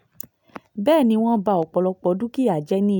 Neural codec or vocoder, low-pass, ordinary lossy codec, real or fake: none; 19.8 kHz; none; real